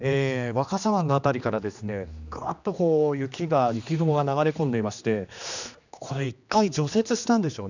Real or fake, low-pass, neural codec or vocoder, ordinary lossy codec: fake; 7.2 kHz; codec, 16 kHz, 2 kbps, X-Codec, HuBERT features, trained on general audio; none